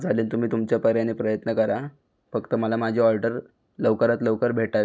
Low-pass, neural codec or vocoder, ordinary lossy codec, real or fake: none; none; none; real